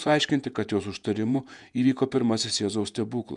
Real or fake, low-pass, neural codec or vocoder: fake; 10.8 kHz; vocoder, 48 kHz, 128 mel bands, Vocos